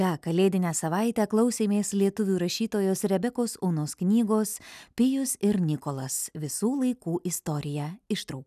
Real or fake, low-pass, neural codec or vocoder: real; 14.4 kHz; none